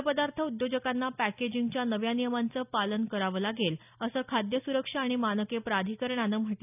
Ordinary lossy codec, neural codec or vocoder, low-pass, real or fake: none; none; 3.6 kHz; real